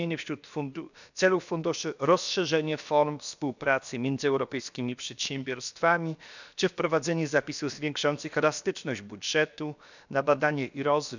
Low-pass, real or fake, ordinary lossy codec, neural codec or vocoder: 7.2 kHz; fake; none; codec, 16 kHz, about 1 kbps, DyCAST, with the encoder's durations